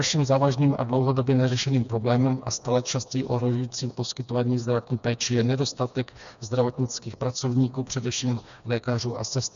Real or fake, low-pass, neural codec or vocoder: fake; 7.2 kHz; codec, 16 kHz, 2 kbps, FreqCodec, smaller model